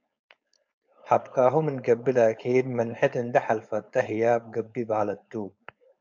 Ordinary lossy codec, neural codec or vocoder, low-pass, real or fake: MP3, 64 kbps; codec, 16 kHz, 4.8 kbps, FACodec; 7.2 kHz; fake